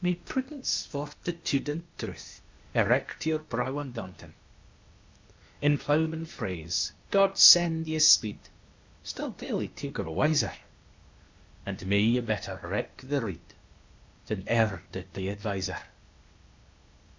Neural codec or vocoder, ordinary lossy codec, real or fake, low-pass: codec, 16 kHz, 0.8 kbps, ZipCodec; MP3, 64 kbps; fake; 7.2 kHz